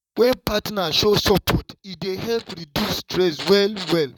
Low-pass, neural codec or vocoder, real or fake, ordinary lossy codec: 19.8 kHz; vocoder, 44.1 kHz, 128 mel bands, Pupu-Vocoder; fake; none